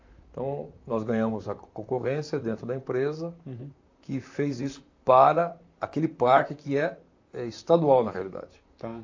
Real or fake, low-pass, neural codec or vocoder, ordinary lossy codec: fake; 7.2 kHz; vocoder, 44.1 kHz, 128 mel bands, Pupu-Vocoder; AAC, 48 kbps